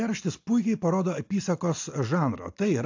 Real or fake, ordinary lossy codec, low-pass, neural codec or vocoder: real; AAC, 48 kbps; 7.2 kHz; none